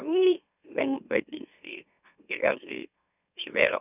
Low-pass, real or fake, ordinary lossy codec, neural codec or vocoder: 3.6 kHz; fake; none; autoencoder, 44.1 kHz, a latent of 192 numbers a frame, MeloTTS